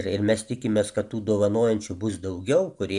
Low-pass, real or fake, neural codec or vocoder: 10.8 kHz; real; none